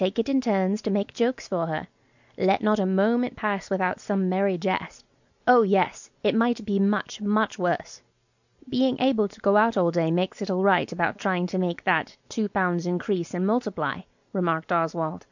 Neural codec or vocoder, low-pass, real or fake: none; 7.2 kHz; real